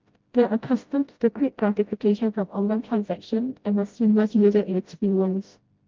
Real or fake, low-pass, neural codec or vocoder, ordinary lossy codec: fake; 7.2 kHz; codec, 16 kHz, 0.5 kbps, FreqCodec, smaller model; Opus, 24 kbps